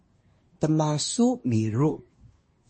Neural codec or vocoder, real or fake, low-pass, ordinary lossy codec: codec, 24 kHz, 1 kbps, SNAC; fake; 9.9 kHz; MP3, 32 kbps